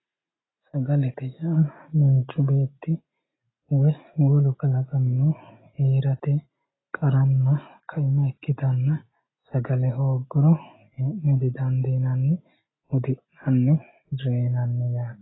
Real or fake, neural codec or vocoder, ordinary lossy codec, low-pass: real; none; AAC, 16 kbps; 7.2 kHz